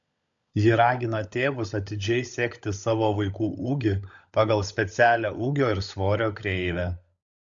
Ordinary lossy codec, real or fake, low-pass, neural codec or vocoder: MP3, 64 kbps; fake; 7.2 kHz; codec, 16 kHz, 16 kbps, FunCodec, trained on LibriTTS, 50 frames a second